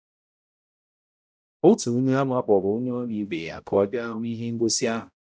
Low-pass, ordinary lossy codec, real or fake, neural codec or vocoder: none; none; fake; codec, 16 kHz, 0.5 kbps, X-Codec, HuBERT features, trained on balanced general audio